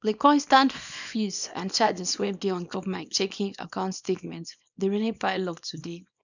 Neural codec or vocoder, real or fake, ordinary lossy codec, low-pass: codec, 24 kHz, 0.9 kbps, WavTokenizer, small release; fake; none; 7.2 kHz